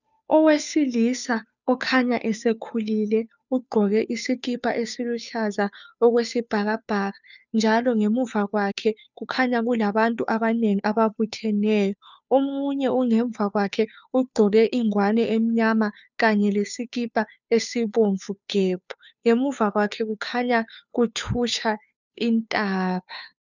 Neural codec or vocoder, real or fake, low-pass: codec, 16 kHz, 2 kbps, FunCodec, trained on Chinese and English, 25 frames a second; fake; 7.2 kHz